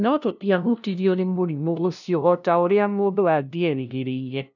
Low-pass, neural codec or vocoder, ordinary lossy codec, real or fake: 7.2 kHz; codec, 16 kHz, 0.5 kbps, FunCodec, trained on LibriTTS, 25 frames a second; none; fake